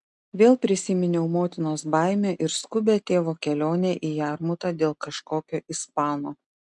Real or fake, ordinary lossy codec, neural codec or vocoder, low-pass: real; MP3, 96 kbps; none; 10.8 kHz